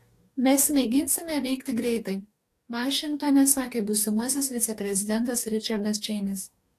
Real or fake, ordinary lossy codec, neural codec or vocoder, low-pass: fake; AAC, 64 kbps; codec, 44.1 kHz, 2.6 kbps, DAC; 14.4 kHz